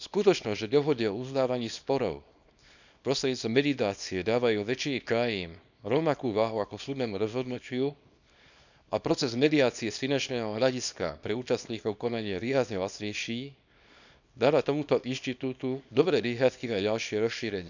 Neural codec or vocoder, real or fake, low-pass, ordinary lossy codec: codec, 24 kHz, 0.9 kbps, WavTokenizer, small release; fake; 7.2 kHz; none